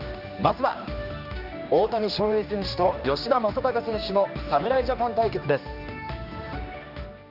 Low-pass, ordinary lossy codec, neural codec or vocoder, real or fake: 5.4 kHz; none; codec, 16 kHz, 2 kbps, X-Codec, HuBERT features, trained on general audio; fake